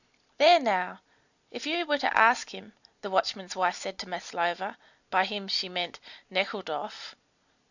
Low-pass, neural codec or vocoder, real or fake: 7.2 kHz; none; real